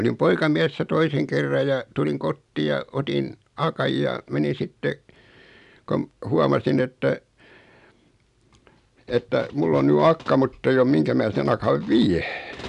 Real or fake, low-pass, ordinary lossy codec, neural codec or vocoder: real; 10.8 kHz; none; none